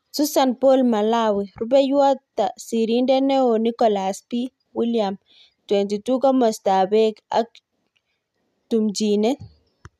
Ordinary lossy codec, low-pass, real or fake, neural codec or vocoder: none; 14.4 kHz; real; none